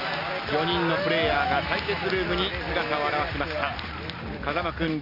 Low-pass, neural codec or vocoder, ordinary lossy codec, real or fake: 5.4 kHz; none; none; real